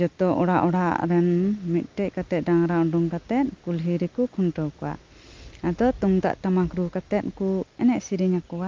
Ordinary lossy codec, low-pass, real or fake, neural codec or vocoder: Opus, 32 kbps; 7.2 kHz; real; none